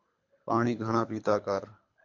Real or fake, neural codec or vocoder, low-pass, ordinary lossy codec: fake; codec, 24 kHz, 3 kbps, HILCodec; 7.2 kHz; AAC, 48 kbps